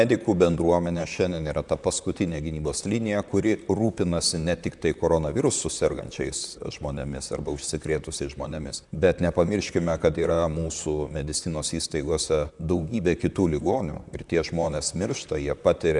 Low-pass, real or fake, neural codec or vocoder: 10.8 kHz; fake; vocoder, 44.1 kHz, 128 mel bands, Pupu-Vocoder